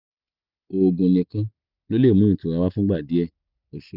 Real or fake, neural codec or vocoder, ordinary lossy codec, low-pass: real; none; none; 5.4 kHz